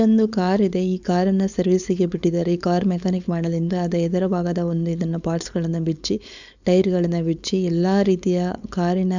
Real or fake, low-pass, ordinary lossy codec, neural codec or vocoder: fake; 7.2 kHz; none; codec, 16 kHz, 4.8 kbps, FACodec